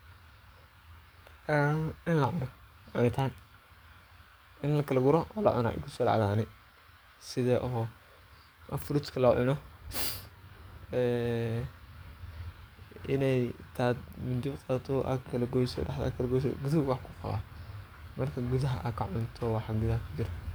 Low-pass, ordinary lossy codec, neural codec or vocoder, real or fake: none; none; codec, 44.1 kHz, 7.8 kbps, DAC; fake